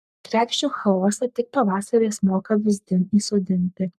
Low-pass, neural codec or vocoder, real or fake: 14.4 kHz; codec, 44.1 kHz, 3.4 kbps, Pupu-Codec; fake